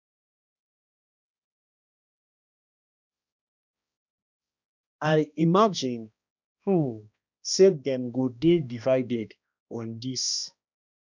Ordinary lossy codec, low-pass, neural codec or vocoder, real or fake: none; 7.2 kHz; codec, 16 kHz, 1 kbps, X-Codec, HuBERT features, trained on balanced general audio; fake